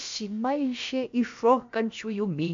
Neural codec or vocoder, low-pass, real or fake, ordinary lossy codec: codec, 16 kHz, about 1 kbps, DyCAST, with the encoder's durations; 7.2 kHz; fake; MP3, 48 kbps